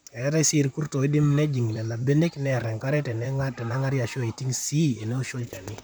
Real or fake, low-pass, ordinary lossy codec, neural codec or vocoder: fake; none; none; vocoder, 44.1 kHz, 128 mel bands, Pupu-Vocoder